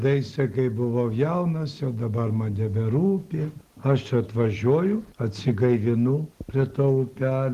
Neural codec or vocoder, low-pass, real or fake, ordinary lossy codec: none; 14.4 kHz; real; Opus, 16 kbps